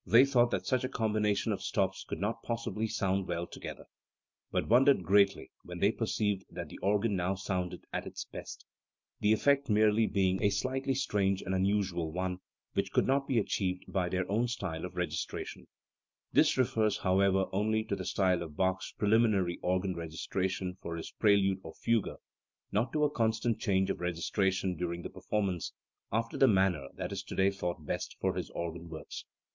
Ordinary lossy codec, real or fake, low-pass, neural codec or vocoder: MP3, 64 kbps; real; 7.2 kHz; none